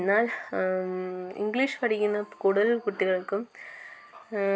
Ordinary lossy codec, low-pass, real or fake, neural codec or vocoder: none; none; real; none